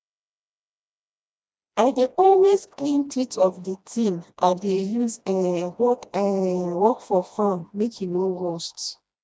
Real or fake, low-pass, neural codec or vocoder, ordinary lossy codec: fake; none; codec, 16 kHz, 1 kbps, FreqCodec, smaller model; none